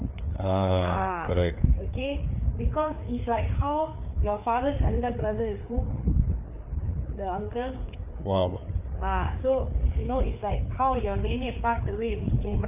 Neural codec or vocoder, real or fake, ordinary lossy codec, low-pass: codec, 16 kHz, 4 kbps, FreqCodec, larger model; fake; none; 3.6 kHz